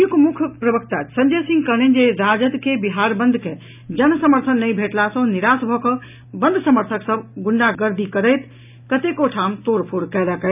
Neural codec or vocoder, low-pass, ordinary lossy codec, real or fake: none; 3.6 kHz; none; real